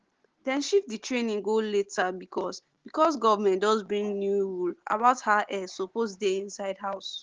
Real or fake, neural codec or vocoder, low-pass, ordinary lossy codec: real; none; 7.2 kHz; Opus, 16 kbps